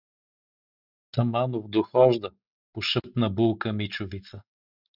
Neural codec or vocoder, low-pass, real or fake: none; 5.4 kHz; real